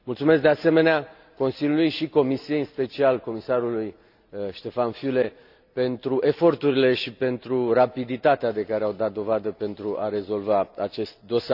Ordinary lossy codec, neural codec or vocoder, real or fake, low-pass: none; none; real; 5.4 kHz